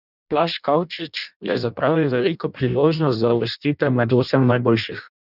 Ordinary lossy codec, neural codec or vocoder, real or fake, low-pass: none; codec, 16 kHz in and 24 kHz out, 0.6 kbps, FireRedTTS-2 codec; fake; 5.4 kHz